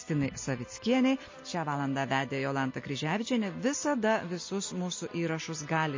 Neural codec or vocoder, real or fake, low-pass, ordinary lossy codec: none; real; 7.2 kHz; MP3, 32 kbps